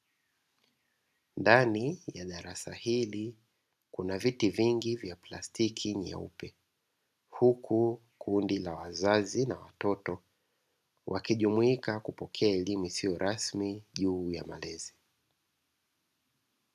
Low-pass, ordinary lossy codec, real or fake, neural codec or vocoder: 14.4 kHz; AAC, 96 kbps; real; none